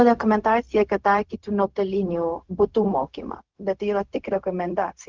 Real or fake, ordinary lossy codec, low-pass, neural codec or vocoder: fake; Opus, 32 kbps; 7.2 kHz; codec, 16 kHz, 0.4 kbps, LongCat-Audio-Codec